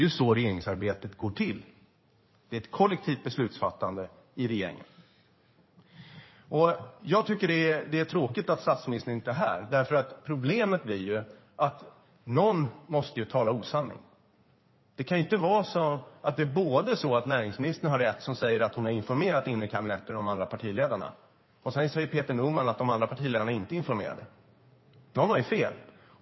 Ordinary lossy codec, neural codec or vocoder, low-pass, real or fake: MP3, 24 kbps; codec, 16 kHz in and 24 kHz out, 2.2 kbps, FireRedTTS-2 codec; 7.2 kHz; fake